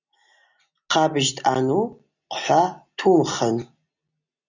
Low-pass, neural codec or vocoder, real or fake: 7.2 kHz; none; real